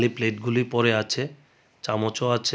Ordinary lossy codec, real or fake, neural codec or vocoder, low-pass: none; real; none; none